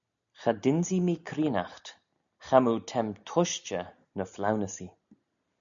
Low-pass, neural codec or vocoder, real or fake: 7.2 kHz; none; real